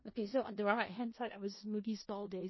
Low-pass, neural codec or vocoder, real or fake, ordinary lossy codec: 7.2 kHz; codec, 16 kHz in and 24 kHz out, 0.4 kbps, LongCat-Audio-Codec, four codebook decoder; fake; MP3, 24 kbps